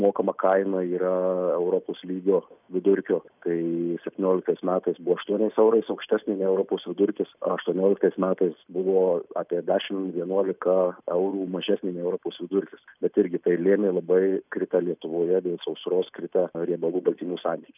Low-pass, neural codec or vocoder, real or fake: 3.6 kHz; none; real